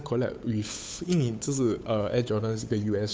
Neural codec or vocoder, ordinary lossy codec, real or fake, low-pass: codec, 16 kHz, 8 kbps, FunCodec, trained on Chinese and English, 25 frames a second; none; fake; none